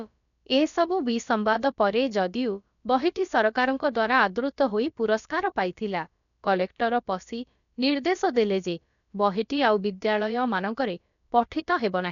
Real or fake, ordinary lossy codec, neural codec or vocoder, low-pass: fake; none; codec, 16 kHz, about 1 kbps, DyCAST, with the encoder's durations; 7.2 kHz